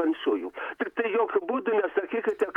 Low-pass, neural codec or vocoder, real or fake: 19.8 kHz; vocoder, 48 kHz, 128 mel bands, Vocos; fake